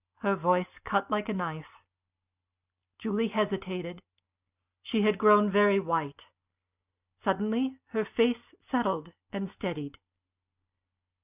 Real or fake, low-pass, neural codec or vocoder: real; 3.6 kHz; none